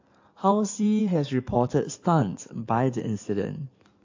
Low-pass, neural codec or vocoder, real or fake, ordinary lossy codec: 7.2 kHz; codec, 16 kHz in and 24 kHz out, 2.2 kbps, FireRedTTS-2 codec; fake; AAC, 48 kbps